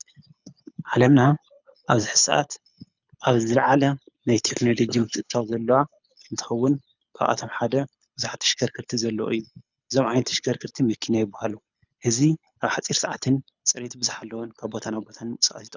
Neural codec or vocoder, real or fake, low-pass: codec, 24 kHz, 6 kbps, HILCodec; fake; 7.2 kHz